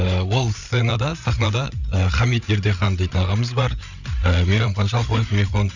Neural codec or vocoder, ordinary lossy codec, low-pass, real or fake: codec, 16 kHz, 8 kbps, FreqCodec, larger model; none; 7.2 kHz; fake